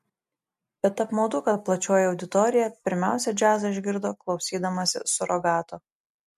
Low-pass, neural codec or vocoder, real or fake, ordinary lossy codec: 14.4 kHz; none; real; MP3, 64 kbps